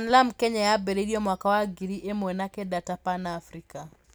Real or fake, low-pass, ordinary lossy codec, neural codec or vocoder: real; none; none; none